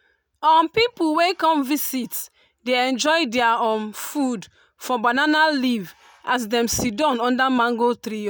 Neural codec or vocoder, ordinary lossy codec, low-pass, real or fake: none; none; none; real